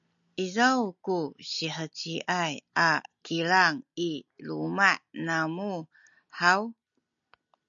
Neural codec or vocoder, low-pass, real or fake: none; 7.2 kHz; real